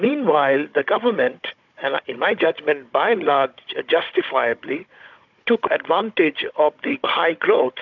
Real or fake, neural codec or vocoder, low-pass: fake; codec, 16 kHz, 16 kbps, FunCodec, trained on Chinese and English, 50 frames a second; 7.2 kHz